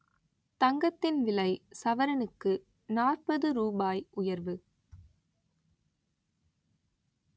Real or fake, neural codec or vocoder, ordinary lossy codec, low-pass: real; none; none; none